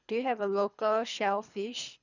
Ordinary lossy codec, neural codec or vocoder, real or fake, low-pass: none; codec, 24 kHz, 3 kbps, HILCodec; fake; 7.2 kHz